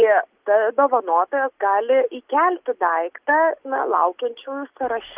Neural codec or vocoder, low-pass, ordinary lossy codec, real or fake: none; 3.6 kHz; Opus, 24 kbps; real